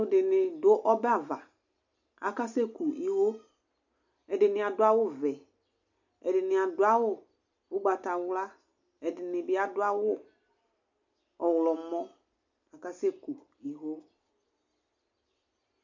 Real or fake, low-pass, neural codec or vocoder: real; 7.2 kHz; none